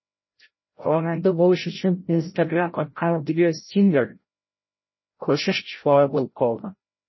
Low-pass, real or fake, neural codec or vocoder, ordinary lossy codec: 7.2 kHz; fake; codec, 16 kHz, 0.5 kbps, FreqCodec, larger model; MP3, 24 kbps